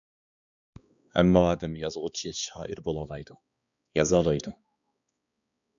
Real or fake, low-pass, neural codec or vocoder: fake; 7.2 kHz; codec, 16 kHz, 2 kbps, X-Codec, HuBERT features, trained on balanced general audio